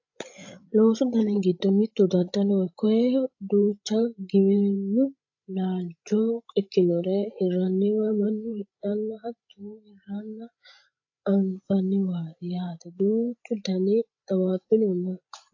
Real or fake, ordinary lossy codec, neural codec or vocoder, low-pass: fake; AAC, 48 kbps; codec, 16 kHz, 16 kbps, FreqCodec, larger model; 7.2 kHz